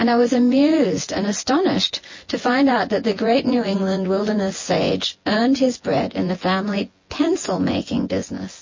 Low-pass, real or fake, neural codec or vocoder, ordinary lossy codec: 7.2 kHz; fake; vocoder, 24 kHz, 100 mel bands, Vocos; MP3, 32 kbps